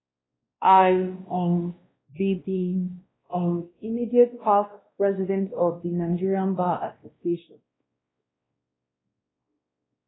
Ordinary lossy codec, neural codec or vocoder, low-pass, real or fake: AAC, 16 kbps; codec, 16 kHz, 1 kbps, X-Codec, WavLM features, trained on Multilingual LibriSpeech; 7.2 kHz; fake